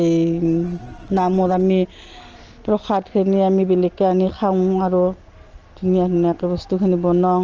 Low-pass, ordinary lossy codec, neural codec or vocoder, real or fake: 7.2 kHz; Opus, 16 kbps; none; real